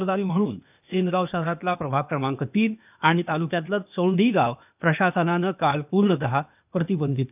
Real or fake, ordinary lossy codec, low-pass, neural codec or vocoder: fake; none; 3.6 kHz; codec, 16 kHz, 0.8 kbps, ZipCodec